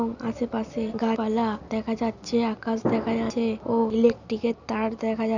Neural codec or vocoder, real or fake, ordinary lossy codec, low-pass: none; real; none; 7.2 kHz